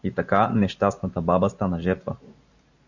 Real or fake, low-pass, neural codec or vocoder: real; 7.2 kHz; none